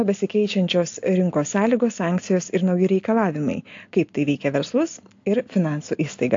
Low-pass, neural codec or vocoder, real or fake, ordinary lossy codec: 7.2 kHz; none; real; AAC, 48 kbps